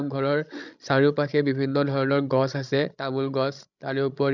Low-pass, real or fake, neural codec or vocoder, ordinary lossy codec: 7.2 kHz; fake; codec, 16 kHz, 16 kbps, FreqCodec, larger model; none